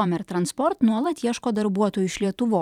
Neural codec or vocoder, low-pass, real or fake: vocoder, 44.1 kHz, 128 mel bands every 512 samples, BigVGAN v2; 19.8 kHz; fake